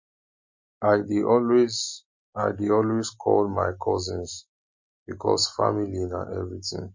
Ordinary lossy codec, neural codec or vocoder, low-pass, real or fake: MP3, 32 kbps; none; 7.2 kHz; real